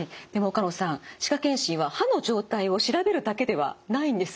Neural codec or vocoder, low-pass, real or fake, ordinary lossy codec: none; none; real; none